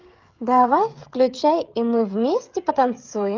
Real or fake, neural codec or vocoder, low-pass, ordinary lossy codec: fake; codec, 16 kHz, 16 kbps, FreqCodec, smaller model; 7.2 kHz; Opus, 32 kbps